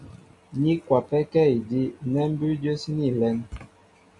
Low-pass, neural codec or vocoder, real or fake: 10.8 kHz; none; real